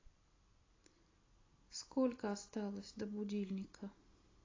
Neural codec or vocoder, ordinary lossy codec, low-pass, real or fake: none; AAC, 32 kbps; 7.2 kHz; real